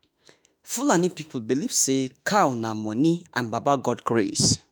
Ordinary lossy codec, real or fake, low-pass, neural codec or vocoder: none; fake; none; autoencoder, 48 kHz, 32 numbers a frame, DAC-VAE, trained on Japanese speech